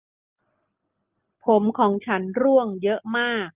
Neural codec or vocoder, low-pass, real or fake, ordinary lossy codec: none; 3.6 kHz; real; Opus, 32 kbps